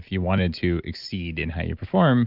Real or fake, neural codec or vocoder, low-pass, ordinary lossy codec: real; none; 5.4 kHz; Opus, 24 kbps